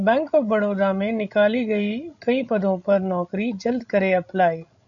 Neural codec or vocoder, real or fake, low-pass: codec, 16 kHz, 16 kbps, FreqCodec, larger model; fake; 7.2 kHz